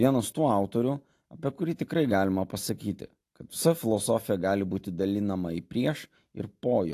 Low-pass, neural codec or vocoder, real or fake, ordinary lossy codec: 14.4 kHz; none; real; AAC, 64 kbps